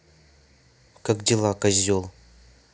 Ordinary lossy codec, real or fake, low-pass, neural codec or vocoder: none; real; none; none